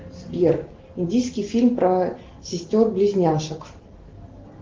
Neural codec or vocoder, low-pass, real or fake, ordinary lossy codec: none; 7.2 kHz; real; Opus, 16 kbps